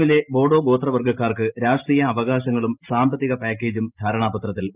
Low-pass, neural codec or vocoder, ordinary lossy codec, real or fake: 3.6 kHz; none; Opus, 24 kbps; real